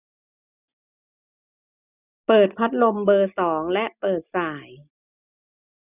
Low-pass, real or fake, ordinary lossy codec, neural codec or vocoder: 3.6 kHz; real; none; none